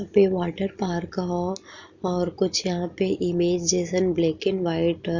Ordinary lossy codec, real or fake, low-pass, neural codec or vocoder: none; real; 7.2 kHz; none